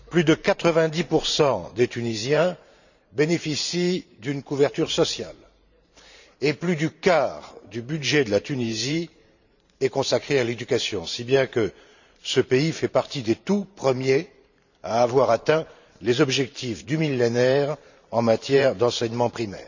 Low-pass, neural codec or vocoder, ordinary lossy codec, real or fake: 7.2 kHz; vocoder, 44.1 kHz, 128 mel bands every 512 samples, BigVGAN v2; none; fake